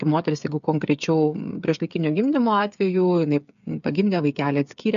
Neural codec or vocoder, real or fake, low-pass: codec, 16 kHz, 8 kbps, FreqCodec, smaller model; fake; 7.2 kHz